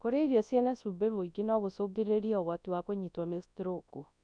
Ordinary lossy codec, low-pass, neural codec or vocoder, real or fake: none; 9.9 kHz; codec, 24 kHz, 0.9 kbps, WavTokenizer, large speech release; fake